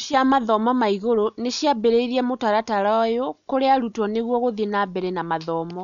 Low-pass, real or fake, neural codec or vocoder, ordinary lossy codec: 7.2 kHz; real; none; none